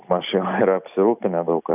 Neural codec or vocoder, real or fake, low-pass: none; real; 3.6 kHz